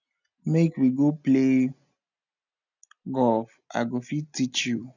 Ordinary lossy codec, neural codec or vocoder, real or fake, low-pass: none; none; real; 7.2 kHz